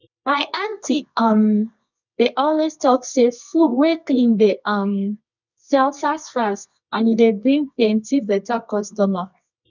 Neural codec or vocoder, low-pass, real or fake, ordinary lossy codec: codec, 24 kHz, 0.9 kbps, WavTokenizer, medium music audio release; 7.2 kHz; fake; none